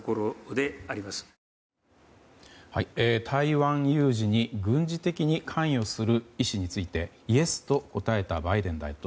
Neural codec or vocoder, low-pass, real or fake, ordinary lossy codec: none; none; real; none